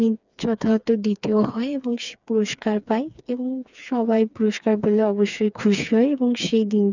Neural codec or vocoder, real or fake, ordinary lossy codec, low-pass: codec, 16 kHz, 4 kbps, FreqCodec, smaller model; fake; none; 7.2 kHz